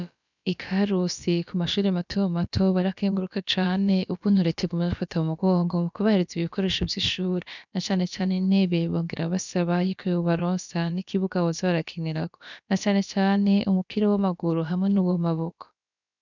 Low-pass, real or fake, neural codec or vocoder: 7.2 kHz; fake; codec, 16 kHz, about 1 kbps, DyCAST, with the encoder's durations